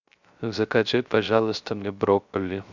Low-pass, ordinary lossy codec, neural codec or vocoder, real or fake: 7.2 kHz; Opus, 64 kbps; codec, 16 kHz, 0.3 kbps, FocalCodec; fake